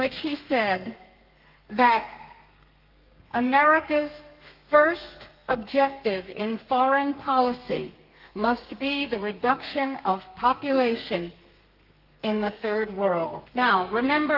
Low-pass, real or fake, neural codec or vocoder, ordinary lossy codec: 5.4 kHz; fake; codec, 32 kHz, 1.9 kbps, SNAC; Opus, 32 kbps